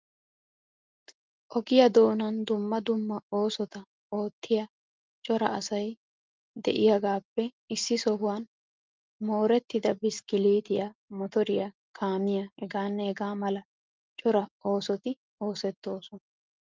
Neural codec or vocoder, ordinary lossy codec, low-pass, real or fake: none; Opus, 32 kbps; 7.2 kHz; real